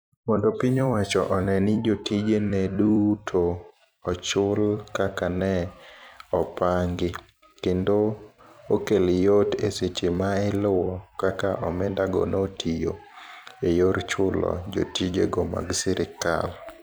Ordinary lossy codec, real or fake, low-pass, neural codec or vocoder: none; fake; none; vocoder, 44.1 kHz, 128 mel bands every 256 samples, BigVGAN v2